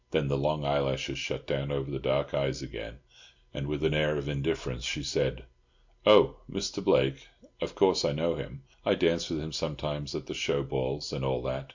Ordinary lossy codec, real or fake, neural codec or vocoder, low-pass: MP3, 64 kbps; real; none; 7.2 kHz